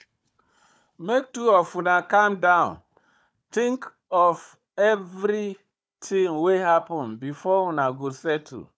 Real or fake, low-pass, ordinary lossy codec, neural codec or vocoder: fake; none; none; codec, 16 kHz, 4 kbps, FunCodec, trained on Chinese and English, 50 frames a second